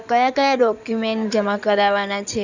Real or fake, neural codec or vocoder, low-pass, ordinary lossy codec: fake; codec, 16 kHz in and 24 kHz out, 2.2 kbps, FireRedTTS-2 codec; 7.2 kHz; none